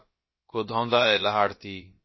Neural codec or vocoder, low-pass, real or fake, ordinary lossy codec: codec, 16 kHz, about 1 kbps, DyCAST, with the encoder's durations; 7.2 kHz; fake; MP3, 24 kbps